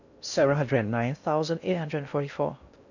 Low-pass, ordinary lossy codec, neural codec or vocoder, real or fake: 7.2 kHz; none; codec, 16 kHz in and 24 kHz out, 0.6 kbps, FocalCodec, streaming, 4096 codes; fake